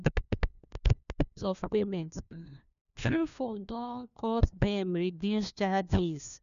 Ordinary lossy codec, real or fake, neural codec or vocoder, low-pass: none; fake; codec, 16 kHz, 1 kbps, FunCodec, trained on LibriTTS, 50 frames a second; 7.2 kHz